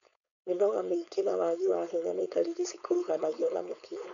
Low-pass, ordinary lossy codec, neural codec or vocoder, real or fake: 7.2 kHz; none; codec, 16 kHz, 4.8 kbps, FACodec; fake